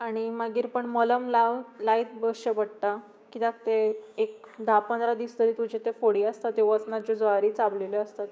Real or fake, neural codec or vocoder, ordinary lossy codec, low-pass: fake; codec, 16 kHz, 6 kbps, DAC; none; none